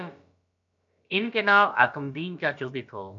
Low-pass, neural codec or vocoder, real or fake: 7.2 kHz; codec, 16 kHz, about 1 kbps, DyCAST, with the encoder's durations; fake